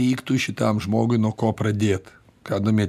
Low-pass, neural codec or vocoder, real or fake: 14.4 kHz; none; real